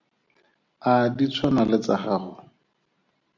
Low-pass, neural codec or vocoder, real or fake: 7.2 kHz; none; real